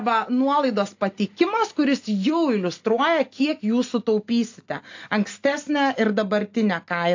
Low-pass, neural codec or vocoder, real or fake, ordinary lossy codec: 7.2 kHz; none; real; AAC, 48 kbps